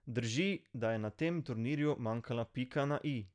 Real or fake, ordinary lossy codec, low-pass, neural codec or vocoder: real; none; 9.9 kHz; none